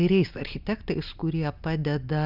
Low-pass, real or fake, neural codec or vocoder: 5.4 kHz; real; none